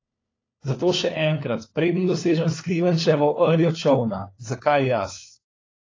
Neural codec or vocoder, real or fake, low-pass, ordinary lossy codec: codec, 16 kHz, 4 kbps, FunCodec, trained on LibriTTS, 50 frames a second; fake; 7.2 kHz; AAC, 32 kbps